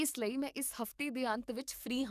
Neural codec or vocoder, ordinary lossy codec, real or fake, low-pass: codec, 44.1 kHz, 7.8 kbps, DAC; none; fake; 14.4 kHz